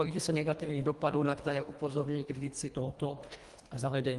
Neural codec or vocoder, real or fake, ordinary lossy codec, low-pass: codec, 24 kHz, 1.5 kbps, HILCodec; fake; Opus, 24 kbps; 10.8 kHz